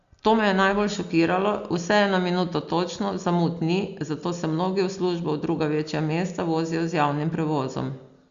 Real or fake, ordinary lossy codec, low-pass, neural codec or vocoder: real; Opus, 64 kbps; 7.2 kHz; none